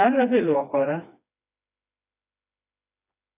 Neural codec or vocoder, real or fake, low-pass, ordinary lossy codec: codec, 16 kHz, 2 kbps, FreqCodec, smaller model; fake; 3.6 kHz; AAC, 32 kbps